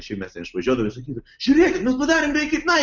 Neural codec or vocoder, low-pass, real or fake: none; 7.2 kHz; real